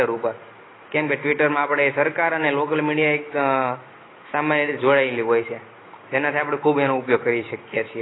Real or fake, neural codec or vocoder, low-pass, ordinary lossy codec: real; none; 7.2 kHz; AAC, 16 kbps